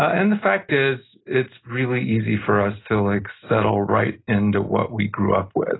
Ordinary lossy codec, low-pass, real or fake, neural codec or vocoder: AAC, 16 kbps; 7.2 kHz; real; none